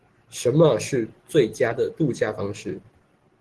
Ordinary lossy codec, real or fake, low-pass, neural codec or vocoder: Opus, 16 kbps; real; 10.8 kHz; none